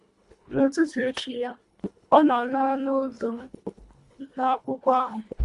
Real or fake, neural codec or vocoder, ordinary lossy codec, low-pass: fake; codec, 24 kHz, 1.5 kbps, HILCodec; Opus, 64 kbps; 10.8 kHz